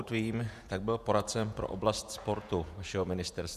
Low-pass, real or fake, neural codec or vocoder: 14.4 kHz; fake; vocoder, 48 kHz, 128 mel bands, Vocos